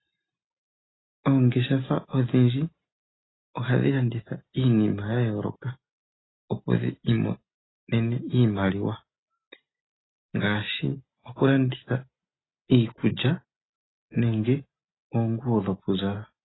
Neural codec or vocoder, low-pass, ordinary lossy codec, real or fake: none; 7.2 kHz; AAC, 16 kbps; real